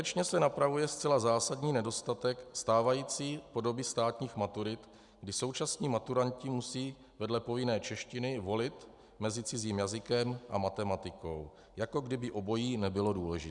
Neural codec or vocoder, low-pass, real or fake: none; 10.8 kHz; real